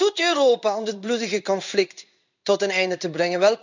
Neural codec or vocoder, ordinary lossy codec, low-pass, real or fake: codec, 16 kHz in and 24 kHz out, 1 kbps, XY-Tokenizer; none; 7.2 kHz; fake